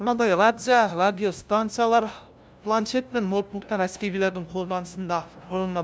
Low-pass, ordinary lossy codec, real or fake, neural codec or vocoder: none; none; fake; codec, 16 kHz, 0.5 kbps, FunCodec, trained on LibriTTS, 25 frames a second